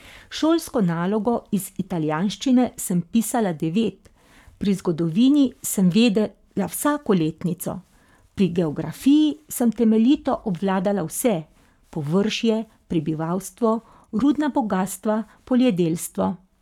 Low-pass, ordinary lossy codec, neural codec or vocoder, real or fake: 19.8 kHz; none; codec, 44.1 kHz, 7.8 kbps, Pupu-Codec; fake